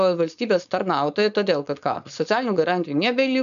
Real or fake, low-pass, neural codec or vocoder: fake; 7.2 kHz; codec, 16 kHz, 4.8 kbps, FACodec